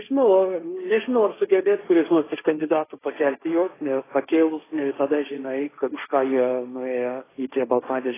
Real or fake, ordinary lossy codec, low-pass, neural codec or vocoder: fake; AAC, 16 kbps; 3.6 kHz; codec, 16 kHz, 1.1 kbps, Voila-Tokenizer